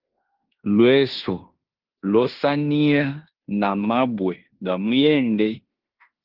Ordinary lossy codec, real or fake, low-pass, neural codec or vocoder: Opus, 16 kbps; fake; 5.4 kHz; codec, 16 kHz in and 24 kHz out, 0.9 kbps, LongCat-Audio-Codec, fine tuned four codebook decoder